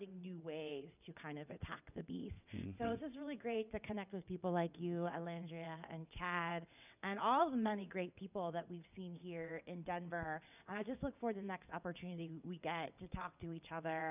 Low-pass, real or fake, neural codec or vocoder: 3.6 kHz; fake; vocoder, 22.05 kHz, 80 mel bands, WaveNeXt